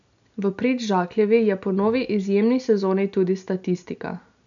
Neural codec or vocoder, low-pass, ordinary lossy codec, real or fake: none; 7.2 kHz; none; real